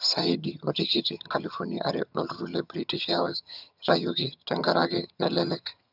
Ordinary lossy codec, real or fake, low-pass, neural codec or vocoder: none; fake; 5.4 kHz; vocoder, 22.05 kHz, 80 mel bands, HiFi-GAN